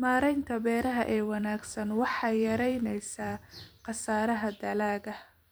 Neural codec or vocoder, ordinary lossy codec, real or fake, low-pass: vocoder, 44.1 kHz, 128 mel bands every 256 samples, BigVGAN v2; none; fake; none